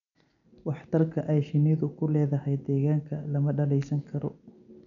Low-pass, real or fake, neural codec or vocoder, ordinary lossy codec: 7.2 kHz; real; none; none